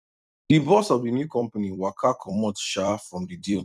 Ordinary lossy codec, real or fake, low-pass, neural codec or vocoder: none; fake; 14.4 kHz; vocoder, 44.1 kHz, 128 mel bands every 256 samples, BigVGAN v2